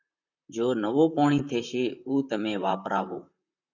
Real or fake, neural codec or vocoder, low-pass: fake; vocoder, 44.1 kHz, 128 mel bands, Pupu-Vocoder; 7.2 kHz